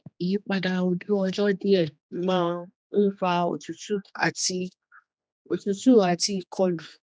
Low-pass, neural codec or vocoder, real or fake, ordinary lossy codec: none; codec, 16 kHz, 2 kbps, X-Codec, HuBERT features, trained on general audio; fake; none